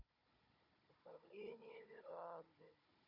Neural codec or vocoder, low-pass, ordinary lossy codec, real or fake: codec, 16 kHz, 16 kbps, FunCodec, trained on Chinese and English, 50 frames a second; 5.4 kHz; Opus, 64 kbps; fake